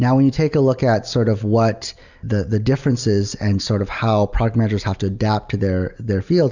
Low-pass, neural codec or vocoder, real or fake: 7.2 kHz; none; real